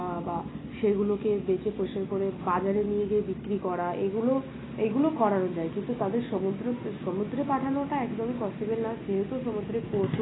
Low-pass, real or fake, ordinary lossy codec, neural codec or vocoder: 7.2 kHz; real; AAC, 16 kbps; none